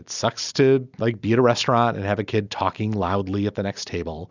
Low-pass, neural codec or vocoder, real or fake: 7.2 kHz; none; real